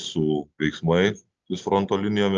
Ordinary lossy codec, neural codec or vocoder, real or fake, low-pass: Opus, 24 kbps; none; real; 7.2 kHz